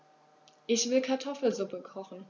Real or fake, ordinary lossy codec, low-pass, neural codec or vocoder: real; none; 7.2 kHz; none